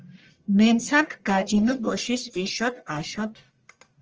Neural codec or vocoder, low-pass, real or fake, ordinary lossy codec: codec, 44.1 kHz, 1.7 kbps, Pupu-Codec; 7.2 kHz; fake; Opus, 24 kbps